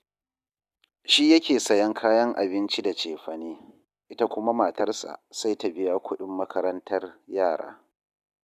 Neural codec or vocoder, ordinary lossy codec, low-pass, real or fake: none; none; 14.4 kHz; real